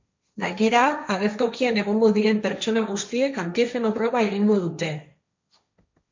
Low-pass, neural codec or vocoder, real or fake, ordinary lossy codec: 7.2 kHz; codec, 16 kHz, 1.1 kbps, Voila-Tokenizer; fake; MP3, 64 kbps